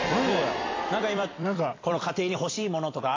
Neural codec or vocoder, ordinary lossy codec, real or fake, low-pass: vocoder, 44.1 kHz, 128 mel bands every 256 samples, BigVGAN v2; AAC, 32 kbps; fake; 7.2 kHz